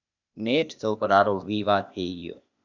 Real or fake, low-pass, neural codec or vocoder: fake; 7.2 kHz; codec, 16 kHz, 0.8 kbps, ZipCodec